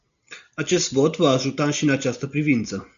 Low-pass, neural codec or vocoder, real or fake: 7.2 kHz; none; real